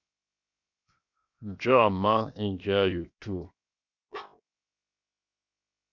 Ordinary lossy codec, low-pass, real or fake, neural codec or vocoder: Opus, 64 kbps; 7.2 kHz; fake; codec, 16 kHz, 0.7 kbps, FocalCodec